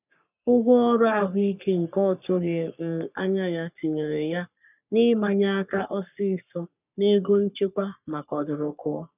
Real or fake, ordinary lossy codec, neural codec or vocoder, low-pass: fake; none; codec, 44.1 kHz, 3.4 kbps, Pupu-Codec; 3.6 kHz